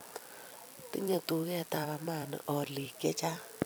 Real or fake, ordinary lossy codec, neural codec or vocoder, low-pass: fake; none; vocoder, 44.1 kHz, 128 mel bands every 256 samples, BigVGAN v2; none